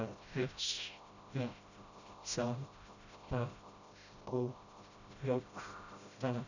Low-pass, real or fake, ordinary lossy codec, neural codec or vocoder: 7.2 kHz; fake; none; codec, 16 kHz, 0.5 kbps, FreqCodec, smaller model